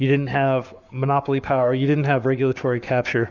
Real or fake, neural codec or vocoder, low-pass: fake; codec, 44.1 kHz, 7.8 kbps, Pupu-Codec; 7.2 kHz